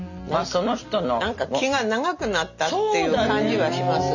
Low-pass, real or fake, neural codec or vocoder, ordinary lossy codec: 7.2 kHz; real; none; none